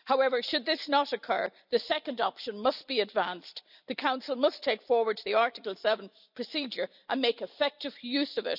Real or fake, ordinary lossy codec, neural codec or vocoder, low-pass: real; none; none; 5.4 kHz